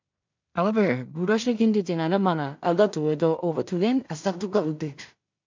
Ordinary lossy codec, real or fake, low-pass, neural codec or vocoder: MP3, 64 kbps; fake; 7.2 kHz; codec, 16 kHz in and 24 kHz out, 0.4 kbps, LongCat-Audio-Codec, two codebook decoder